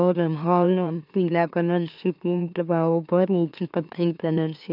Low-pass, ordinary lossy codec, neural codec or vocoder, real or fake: 5.4 kHz; none; autoencoder, 44.1 kHz, a latent of 192 numbers a frame, MeloTTS; fake